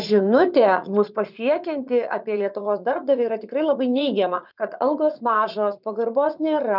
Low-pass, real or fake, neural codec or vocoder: 5.4 kHz; fake; vocoder, 44.1 kHz, 80 mel bands, Vocos